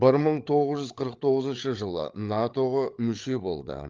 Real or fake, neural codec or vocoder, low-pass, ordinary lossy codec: fake; codec, 16 kHz, 8 kbps, FreqCodec, larger model; 7.2 kHz; Opus, 32 kbps